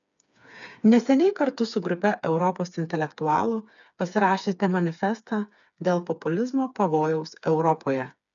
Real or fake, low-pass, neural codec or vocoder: fake; 7.2 kHz; codec, 16 kHz, 4 kbps, FreqCodec, smaller model